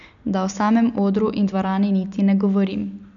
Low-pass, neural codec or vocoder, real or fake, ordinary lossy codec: 7.2 kHz; none; real; none